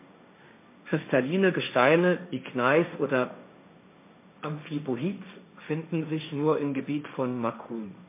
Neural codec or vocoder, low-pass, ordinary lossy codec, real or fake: codec, 16 kHz, 1.1 kbps, Voila-Tokenizer; 3.6 kHz; MP3, 24 kbps; fake